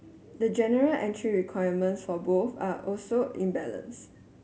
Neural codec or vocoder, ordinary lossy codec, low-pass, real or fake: none; none; none; real